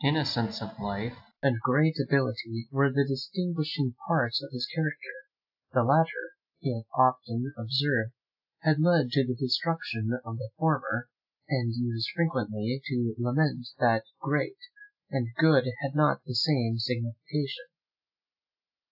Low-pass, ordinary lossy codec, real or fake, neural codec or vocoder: 5.4 kHz; AAC, 48 kbps; real; none